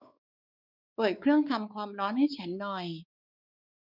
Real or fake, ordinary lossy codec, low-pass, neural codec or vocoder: fake; none; 5.4 kHz; codec, 16 kHz, 4 kbps, X-Codec, WavLM features, trained on Multilingual LibriSpeech